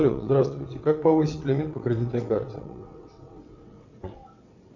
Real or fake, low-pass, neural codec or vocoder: fake; 7.2 kHz; vocoder, 22.05 kHz, 80 mel bands, WaveNeXt